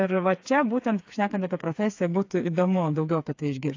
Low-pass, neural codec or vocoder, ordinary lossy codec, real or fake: 7.2 kHz; codec, 16 kHz, 4 kbps, FreqCodec, smaller model; AAC, 48 kbps; fake